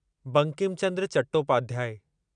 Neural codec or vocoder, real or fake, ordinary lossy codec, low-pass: none; real; none; 9.9 kHz